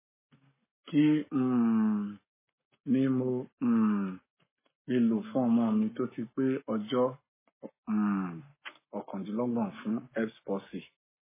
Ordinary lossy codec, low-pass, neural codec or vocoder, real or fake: MP3, 16 kbps; 3.6 kHz; codec, 44.1 kHz, 7.8 kbps, Pupu-Codec; fake